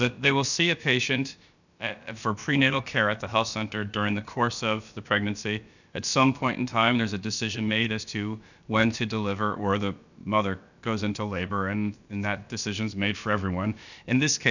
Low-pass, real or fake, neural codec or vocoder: 7.2 kHz; fake; codec, 16 kHz, about 1 kbps, DyCAST, with the encoder's durations